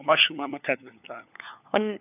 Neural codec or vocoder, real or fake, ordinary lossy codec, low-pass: codec, 16 kHz, 4 kbps, FunCodec, trained on LibriTTS, 50 frames a second; fake; none; 3.6 kHz